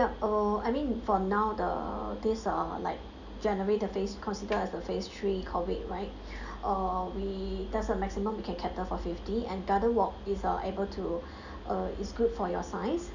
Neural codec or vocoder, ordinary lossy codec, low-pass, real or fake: none; none; 7.2 kHz; real